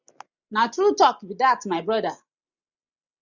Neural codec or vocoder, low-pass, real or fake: none; 7.2 kHz; real